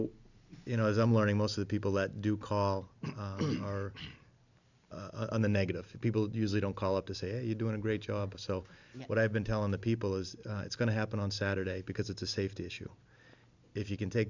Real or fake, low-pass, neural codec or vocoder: real; 7.2 kHz; none